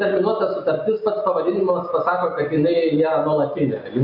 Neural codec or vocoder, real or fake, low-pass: none; real; 5.4 kHz